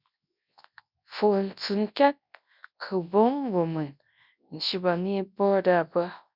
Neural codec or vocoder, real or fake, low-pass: codec, 24 kHz, 0.9 kbps, WavTokenizer, large speech release; fake; 5.4 kHz